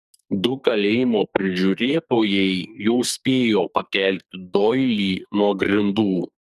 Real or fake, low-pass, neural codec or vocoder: fake; 14.4 kHz; codec, 44.1 kHz, 2.6 kbps, SNAC